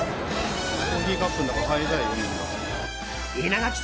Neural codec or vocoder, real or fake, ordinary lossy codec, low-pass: none; real; none; none